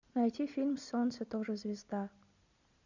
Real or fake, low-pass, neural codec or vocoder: real; 7.2 kHz; none